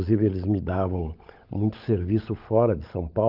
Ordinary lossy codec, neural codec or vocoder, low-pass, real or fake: Opus, 32 kbps; codec, 16 kHz, 16 kbps, FunCodec, trained on LibriTTS, 50 frames a second; 5.4 kHz; fake